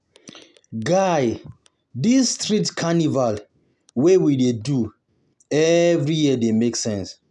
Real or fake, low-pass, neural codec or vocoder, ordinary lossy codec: real; 10.8 kHz; none; none